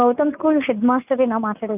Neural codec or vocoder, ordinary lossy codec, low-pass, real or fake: none; none; 3.6 kHz; real